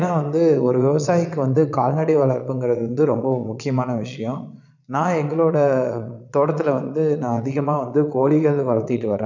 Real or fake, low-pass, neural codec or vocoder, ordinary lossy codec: fake; 7.2 kHz; vocoder, 22.05 kHz, 80 mel bands, Vocos; none